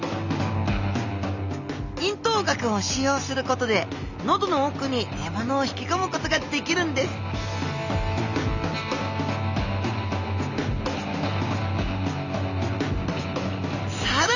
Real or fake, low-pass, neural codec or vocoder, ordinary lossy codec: real; 7.2 kHz; none; none